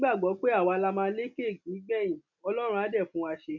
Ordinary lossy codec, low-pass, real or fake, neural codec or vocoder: none; 7.2 kHz; real; none